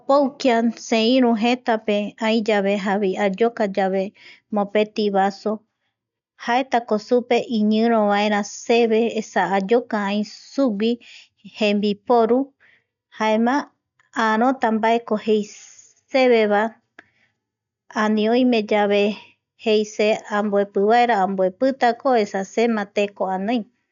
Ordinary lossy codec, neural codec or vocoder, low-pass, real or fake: none; none; 7.2 kHz; real